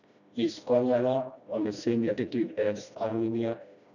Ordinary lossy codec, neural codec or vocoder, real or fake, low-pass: none; codec, 16 kHz, 1 kbps, FreqCodec, smaller model; fake; 7.2 kHz